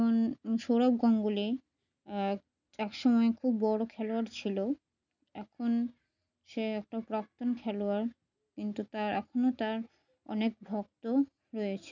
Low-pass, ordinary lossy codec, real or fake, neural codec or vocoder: 7.2 kHz; none; real; none